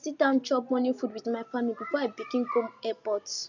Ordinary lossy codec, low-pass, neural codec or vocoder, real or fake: none; 7.2 kHz; none; real